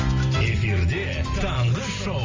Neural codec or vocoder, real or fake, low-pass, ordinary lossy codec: none; real; 7.2 kHz; MP3, 48 kbps